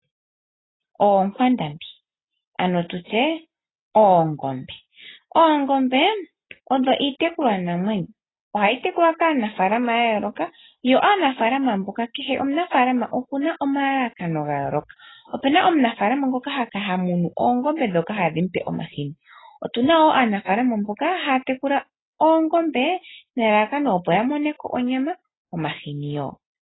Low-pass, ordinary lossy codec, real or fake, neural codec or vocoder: 7.2 kHz; AAC, 16 kbps; real; none